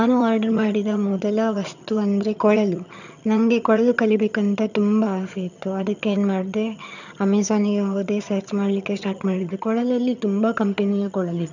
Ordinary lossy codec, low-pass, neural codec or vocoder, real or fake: none; 7.2 kHz; vocoder, 22.05 kHz, 80 mel bands, HiFi-GAN; fake